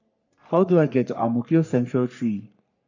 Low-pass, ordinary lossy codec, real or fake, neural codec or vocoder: 7.2 kHz; none; fake; codec, 44.1 kHz, 3.4 kbps, Pupu-Codec